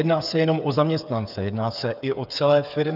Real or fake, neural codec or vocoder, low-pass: fake; codec, 16 kHz, 8 kbps, FreqCodec, smaller model; 5.4 kHz